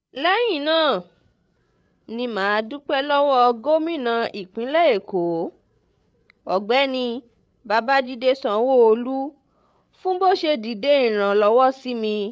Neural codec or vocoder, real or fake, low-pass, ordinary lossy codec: codec, 16 kHz, 8 kbps, FreqCodec, larger model; fake; none; none